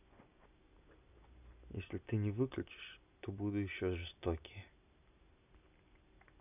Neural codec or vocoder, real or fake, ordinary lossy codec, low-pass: none; real; none; 3.6 kHz